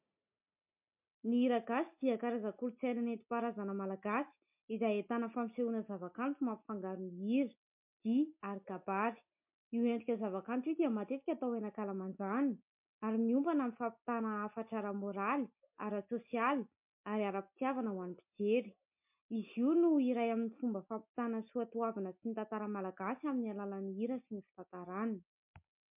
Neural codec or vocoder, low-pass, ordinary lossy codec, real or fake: none; 3.6 kHz; MP3, 32 kbps; real